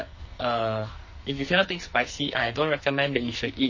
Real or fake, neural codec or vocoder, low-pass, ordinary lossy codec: fake; codec, 44.1 kHz, 2.6 kbps, SNAC; 7.2 kHz; MP3, 32 kbps